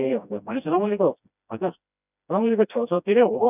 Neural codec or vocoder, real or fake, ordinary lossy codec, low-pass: codec, 16 kHz, 1 kbps, FreqCodec, smaller model; fake; none; 3.6 kHz